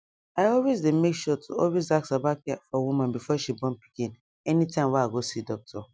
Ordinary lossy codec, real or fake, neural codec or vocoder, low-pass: none; real; none; none